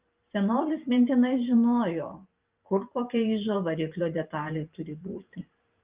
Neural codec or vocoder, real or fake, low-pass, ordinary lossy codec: vocoder, 44.1 kHz, 128 mel bands every 512 samples, BigVGAN v2; fake; 3.6 kHz; Opus, 32 kbps